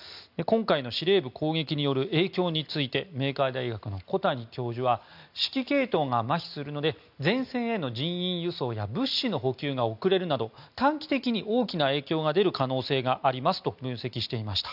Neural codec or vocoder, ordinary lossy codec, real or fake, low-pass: none; none; real; 5.4 kHz